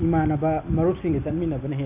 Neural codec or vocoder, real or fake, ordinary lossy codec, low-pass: none; real; none; 3.6 kHz